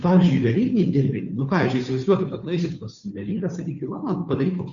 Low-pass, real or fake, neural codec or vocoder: 7.2 kHz; fake; codec, 16 kHz, 2 kbps, FunCodec, trained on Chinese and English, 25 frames a second